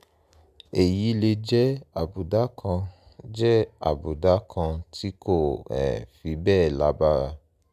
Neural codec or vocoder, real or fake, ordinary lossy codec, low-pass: none; real; none; 14.4 kHz